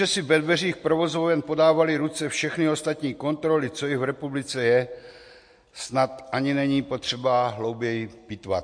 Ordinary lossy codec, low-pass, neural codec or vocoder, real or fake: MP3, 48 kbps; 9.9 kHz; none; real